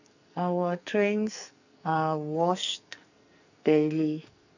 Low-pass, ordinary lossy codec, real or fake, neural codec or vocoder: 7.2 kHz; none; fake; codec, 44.1 kHz, 2.6 kbps, SNAC